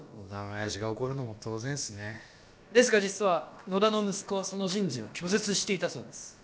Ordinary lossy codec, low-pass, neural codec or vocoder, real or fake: none; none; codec, 16 kHz, about 1 kbps, DyCAST, with the encoder's durations; fake